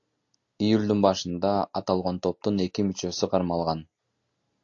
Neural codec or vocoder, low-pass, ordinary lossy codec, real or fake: none; 7.2 kHz; AAC, 48 kbps; real